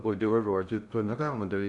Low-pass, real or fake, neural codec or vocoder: 10.8 kHz; fake; codec, 16 kHz in and 24 kHz out, 0.6 kbps, FocalCodec, streaming, 2048 codes